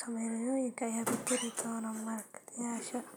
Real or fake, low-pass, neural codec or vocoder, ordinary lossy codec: real; none; none; none